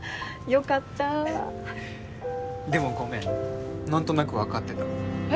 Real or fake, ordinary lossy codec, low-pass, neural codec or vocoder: real; none; none; none